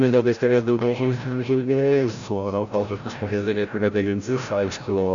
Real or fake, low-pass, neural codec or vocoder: fake; 7.2 kHz; codec, 16 kHz, 0.5 kbps, FreqCodec, larger model